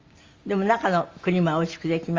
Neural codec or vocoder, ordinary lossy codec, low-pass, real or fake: none; Opus, 32 kbps; 7.2 kHz; real